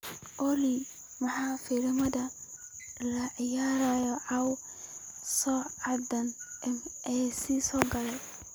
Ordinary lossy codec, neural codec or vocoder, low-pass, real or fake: none; none; none; real